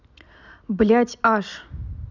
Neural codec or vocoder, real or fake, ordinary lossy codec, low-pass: none; real; none; 7.2 kHz